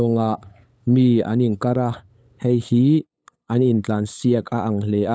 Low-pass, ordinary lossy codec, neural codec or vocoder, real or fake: none; none; codec, 16 kHz, 8 kbps, FunCodec, trained on LibriTTS, 25 frames a second; fake